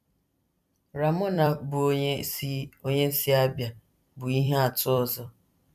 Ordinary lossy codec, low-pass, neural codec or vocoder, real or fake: none; 14.4 kHz; vocoder, 44.1 kHz, 128 mel bands every 256 samples, BigVGAN v2; fake